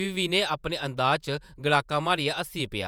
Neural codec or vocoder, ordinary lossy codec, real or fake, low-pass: vocoder, 48 kHz, 128 mel bands, Vocos; none; fake; none